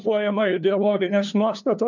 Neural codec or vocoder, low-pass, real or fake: codec, 16 kHz, 2 kbps, FunCodec, trained on LibriTTS, 25 frames a second; 7.2 kHz; fake